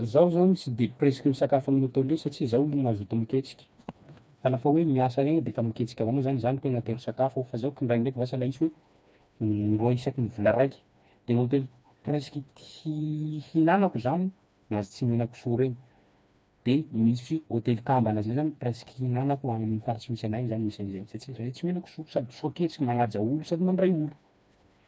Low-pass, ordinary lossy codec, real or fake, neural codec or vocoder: none; none; fake; codec, 16 kHz, 2 kbps, FreqCodec, smaller model